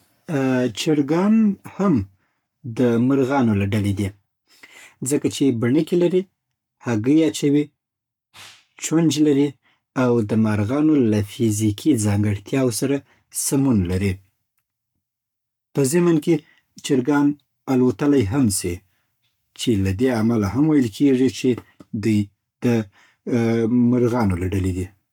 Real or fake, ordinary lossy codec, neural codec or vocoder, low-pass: fake; none; codec, 44.1 kHz, 7.8 kbps, Pupu-Codec; 19.8 kHz